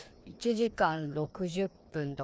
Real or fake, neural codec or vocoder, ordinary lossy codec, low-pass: fake; codec, 16 kHz, 2 kbps, FreqCodec, larger model; none; none